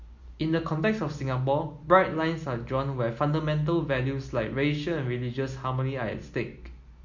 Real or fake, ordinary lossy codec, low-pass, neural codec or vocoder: real; MP3, 48 kbps; 7.2 kHz; none